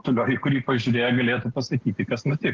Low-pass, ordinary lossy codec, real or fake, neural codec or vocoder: 7.2 kHz; Opus, 16 kbps; real; none